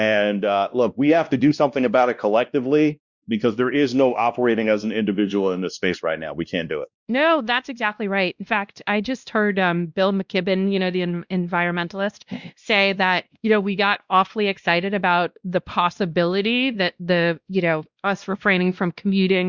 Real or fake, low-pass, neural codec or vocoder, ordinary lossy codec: fake; 7.2 kHz; codec, 16 kHz, 1 kbps, X-Codec, WavLM features, trained on Multilingual LibriSpeech; Opus, 64 kbps